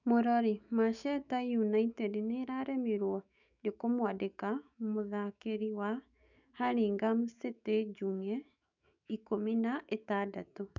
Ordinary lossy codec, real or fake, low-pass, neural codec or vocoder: none; fake; 7.2 kHz; autoencoder, 48 kHz, 128 numbers a frame, DAC-VAE, trained on Japanese speech